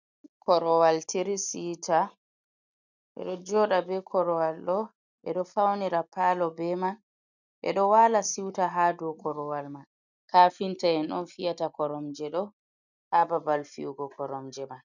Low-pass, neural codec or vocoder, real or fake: 7.2 kHz; none; real